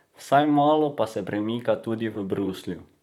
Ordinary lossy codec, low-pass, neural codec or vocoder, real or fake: none; 19.8 kHz; vocoder, 44.1 kHz, 128 mel bands, Pupu-Vocoder; fake